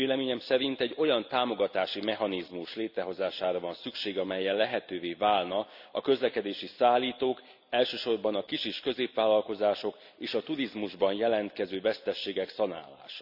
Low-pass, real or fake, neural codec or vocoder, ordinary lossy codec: 5.4 kHz; real; none; none